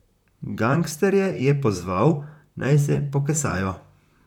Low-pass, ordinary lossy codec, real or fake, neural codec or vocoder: 19.8 kHz; none; fake; vocoder, 44.1 kHz, 128 mel bands, Pupu-Vocoder